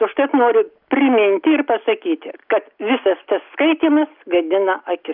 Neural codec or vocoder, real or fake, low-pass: none; real; 5.4 kHz